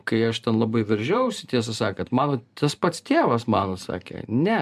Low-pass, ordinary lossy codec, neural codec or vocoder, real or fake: 14.4 kHz; MP3, 96 kbps; vocoder, 44.1 kHz, 128 mel bands every 512 samples, BigVGAN v2; fake